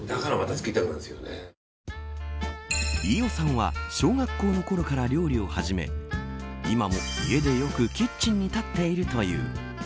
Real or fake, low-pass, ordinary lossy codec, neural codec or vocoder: real; none; none; none